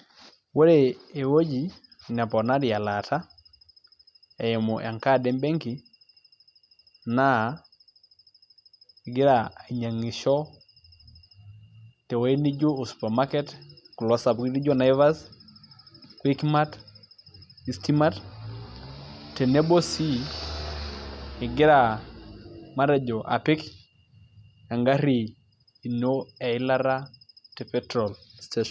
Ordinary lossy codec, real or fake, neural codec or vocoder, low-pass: none; real; none; none